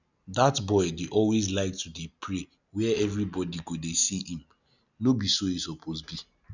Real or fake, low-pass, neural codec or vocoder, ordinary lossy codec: real; 7.2 kHz; none; none